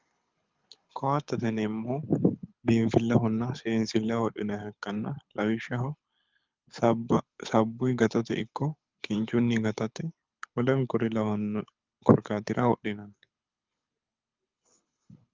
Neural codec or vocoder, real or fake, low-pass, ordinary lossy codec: codec, 24 kHz, 6 kbps, HILCodec; fake; 7.2 kHz; Opus, 24 kbps